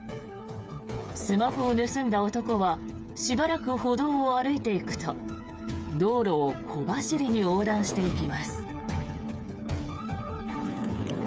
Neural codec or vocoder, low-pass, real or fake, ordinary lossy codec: codec, 16 kHz, 8 kbps, FreqCodec, smaller model; none; fake; none